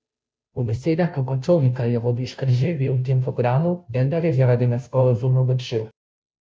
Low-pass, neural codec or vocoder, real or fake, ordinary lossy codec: none; codec, 16 kHz, 0.5 kbps, FunCodec, trained on Chinese and English, 25 frames a second; fake; none